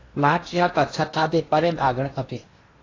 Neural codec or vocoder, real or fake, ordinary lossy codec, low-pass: codec, 16 kHz in and 24 kHz out, 0.8 kbps, FocalCodec, streaming, 65536 codes; fake; AAC, 32 kbps; 7.2 kHz